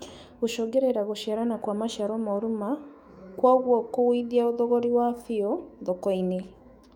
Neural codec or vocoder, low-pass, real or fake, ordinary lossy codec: autoencoder, 48 kHz, 128 numbers a frame, DAC-VAE, trained on Japanese speech; 19.8 kHz; fake; none